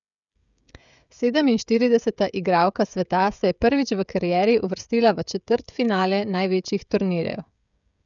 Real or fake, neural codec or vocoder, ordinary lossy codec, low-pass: fake; codec, 16 kHz, 16 kbps, FreqCodec, smaller model; none; 7.2 kHz